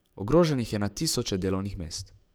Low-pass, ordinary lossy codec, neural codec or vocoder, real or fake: none; none; codec, 44.1 kHz, 7.8 kbps, DAC; fake